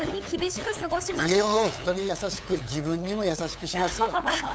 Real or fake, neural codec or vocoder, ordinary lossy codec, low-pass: fake; codec, 16 kHz, 8 kbps, FunCodec, trained on LibriTTS, 25 frames a second; none; none